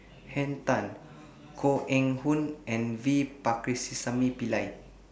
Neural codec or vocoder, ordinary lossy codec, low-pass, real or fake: none; none; none; real